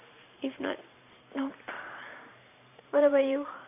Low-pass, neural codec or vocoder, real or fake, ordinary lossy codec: 3.6 kHz; vocoder, 44.1 kHz, 128 mel bands every 512 samples, BigVGAN v2; fake; none